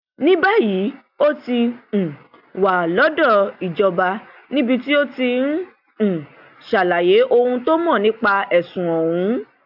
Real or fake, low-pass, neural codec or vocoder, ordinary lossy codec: real; 5.4 kHz; none; AAC, 48 kbps